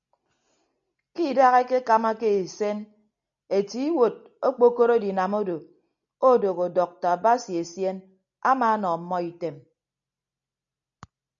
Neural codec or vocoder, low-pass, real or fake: none; 7.2 kHz; real